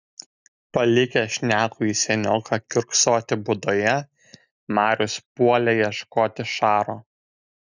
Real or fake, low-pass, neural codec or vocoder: real; 7.2 kHz; none